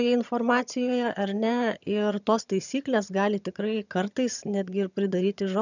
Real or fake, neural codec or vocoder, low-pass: fake; vocoder, 22.05 kHz, 80 mel bands, HiFi-GAN; 7.2 kHz